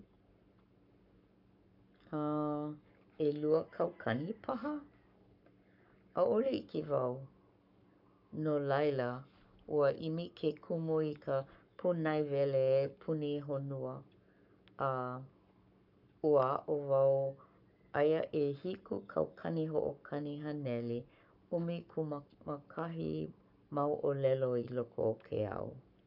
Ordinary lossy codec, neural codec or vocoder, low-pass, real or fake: none; codec, 44.1 kHz, 7.8 kbps, Pupu-Codec; 5.4 kHz; fake